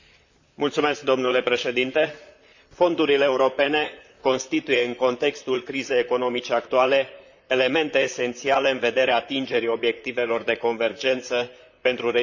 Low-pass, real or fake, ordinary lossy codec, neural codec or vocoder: 7.2 kHz; fake; Opus, 64 kbps; vocoder, 44.1 kHz, 128 mel bands, Pupu-Vocoder